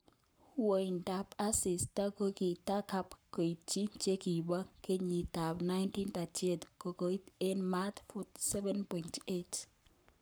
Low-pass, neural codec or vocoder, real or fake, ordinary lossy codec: none; codec, 44.1 kHz, 7.8 kbps, Pupu-Codec; fake; none